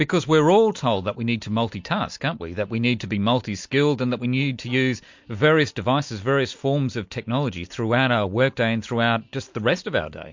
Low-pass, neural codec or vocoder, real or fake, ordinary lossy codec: 7.2 kHz; none; real; MP3, 48 kbps